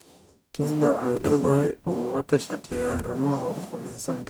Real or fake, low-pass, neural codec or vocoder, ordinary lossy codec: fake; none; codec, 44.1 kHz, 0.9 kbps, DAC; none